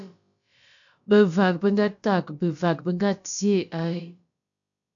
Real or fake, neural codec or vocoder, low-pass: fake; codec, 16 kHz, about 1 kbps, DyCAST, with the encoder's durations; 7.2 kHz